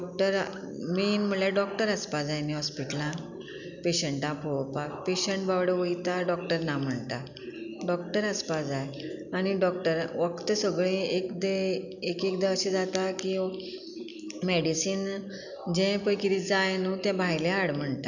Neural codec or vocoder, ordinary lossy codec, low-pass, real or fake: none; none; 7.2 kHz; real